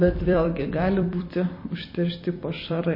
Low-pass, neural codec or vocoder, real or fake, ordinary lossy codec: 5.4 kHz; vocoder, 44.1 kHz, 128 mel bands every 256 samples, BigVGAN v2; fake; MP3, 24 kbps